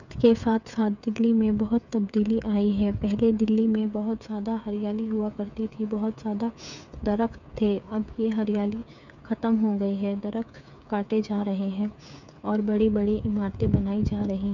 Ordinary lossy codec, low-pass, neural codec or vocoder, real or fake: none; 7.2 kHz; codec, 16 kHz, 8 kbps, FreqCodec, smaller model; fake